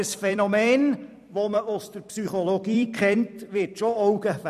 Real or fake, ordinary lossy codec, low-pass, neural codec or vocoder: fake; none; 14.4 kHz; vocoder, 44.1 kHz, 128 mel bands every 256 samples, BigVGAN v2